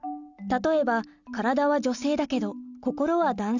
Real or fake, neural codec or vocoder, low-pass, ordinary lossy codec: real; none; 7.2 kHz; none